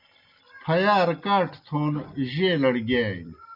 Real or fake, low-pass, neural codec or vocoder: real; 5.4 kHz; none